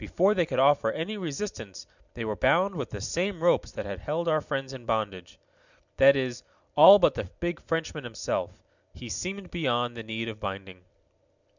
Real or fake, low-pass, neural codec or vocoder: real; 7.2 kHz; none